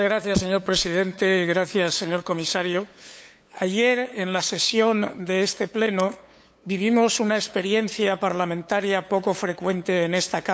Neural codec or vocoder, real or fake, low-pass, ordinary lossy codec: codec, 16 kHz, 8 kbps, FunCodec, trained on LibriTTS, 25 frames a second; fake; none; none